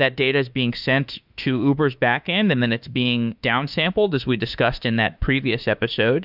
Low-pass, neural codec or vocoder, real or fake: 5.4 kHz; autoencoder, 48 kHz, 32 numbers a frame, DAC-VAE, trained on Japanese speech; fake